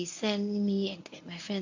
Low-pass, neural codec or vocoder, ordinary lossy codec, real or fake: 7.2 kHz; codec, 24 kHz, 0.9 kbps, WavTokenizer, medium speech release version 1; AAC, 48 kbps; fake